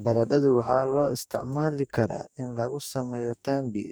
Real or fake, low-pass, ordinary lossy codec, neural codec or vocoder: fake; none; none; codec, 44.1 kHz, 2.6 kbps, DAC